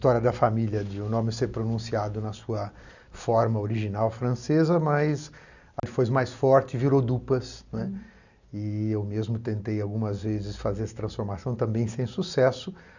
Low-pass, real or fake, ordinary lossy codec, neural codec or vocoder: 7.2 kHz; real; none; none